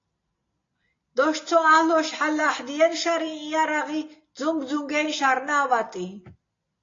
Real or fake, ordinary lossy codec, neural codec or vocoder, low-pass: real; AAC, 32 kbps; none; 7.2 kHz